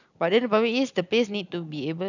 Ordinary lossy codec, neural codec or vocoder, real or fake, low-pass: none; none; real; 7.2 kHz